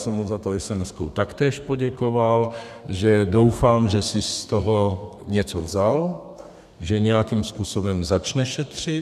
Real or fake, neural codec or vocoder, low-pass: fake; codec, 32 kHz, 1.9 kbps, SNAC; 14.4 kHz